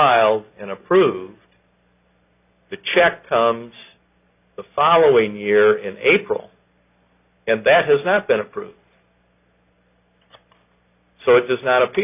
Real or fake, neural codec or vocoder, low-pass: real; none; 3.6 kHz